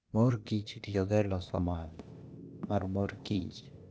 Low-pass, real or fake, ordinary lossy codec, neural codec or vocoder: none; fake; none; codec, 16 kHz, 0.8 kbps, ZipCodec